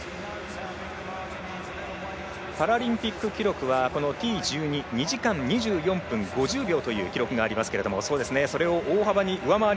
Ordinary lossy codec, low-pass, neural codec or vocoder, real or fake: none; none; none; real